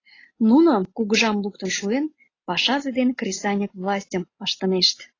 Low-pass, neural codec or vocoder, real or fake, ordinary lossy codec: 7.2 kHz; none; real; AAC, 32 kbps